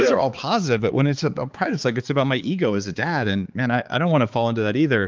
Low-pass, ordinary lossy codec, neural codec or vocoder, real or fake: 7.2 kHz; Opus, 32 kbps; codec, 16 kHz, 4 kbps, X-Codec, HuBERT features, trained on balanced general audio; fake